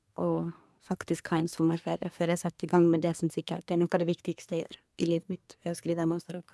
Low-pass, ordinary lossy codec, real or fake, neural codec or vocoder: none; none; fake; codec, 24 kHz, 1 kbps, SNAC